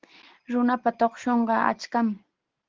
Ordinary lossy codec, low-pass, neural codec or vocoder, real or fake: Opus, 16 kbps; 7.2 kHz; none; real